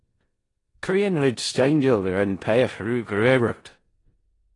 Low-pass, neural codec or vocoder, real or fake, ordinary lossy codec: 10.8 kHz; codec, 16 kHz in and 24 kHz out, 0.4 kbps, LongCat-Audio-Codec, four codebook decoder; fake; AAC, 32 kbps